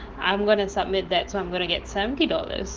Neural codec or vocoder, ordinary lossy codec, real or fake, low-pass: autoencoder, 48 kHz, 128 numbers a frame, DAC-VAE, trained on Japanese speech; Opus, 16 kbps; fake; 7.2 kHz